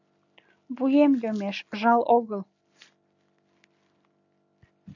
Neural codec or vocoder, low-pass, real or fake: none; 7.2 kHz; real